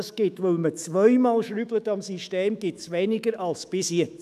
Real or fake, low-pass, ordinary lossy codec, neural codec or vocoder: fake; 14.4 kHz; none; autoencoder, 48 kHz, 128 numbers a frame, DAC-VAE, trained on Japanese speech